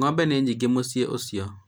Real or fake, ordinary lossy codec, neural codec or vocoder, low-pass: real; none; none; none